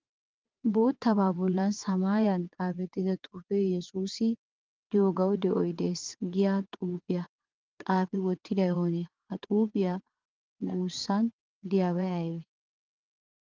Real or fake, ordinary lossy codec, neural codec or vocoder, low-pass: fake; Opus, 24 kbps; vocoder, 22.05 kHz, 80 mel bands, WaveNeXt; 7.2 kHz